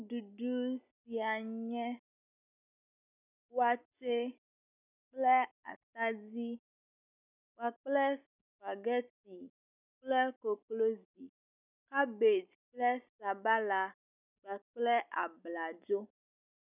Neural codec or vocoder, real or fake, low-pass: none; real; 3.6 kHz